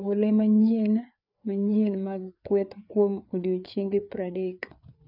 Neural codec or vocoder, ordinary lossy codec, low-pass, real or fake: codec, 16 kHz, 4 kbps, FreqCodec, larger model; none; 5.4 kHz; fake